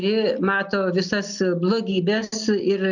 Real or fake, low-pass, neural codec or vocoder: real; 7.2 kHz; none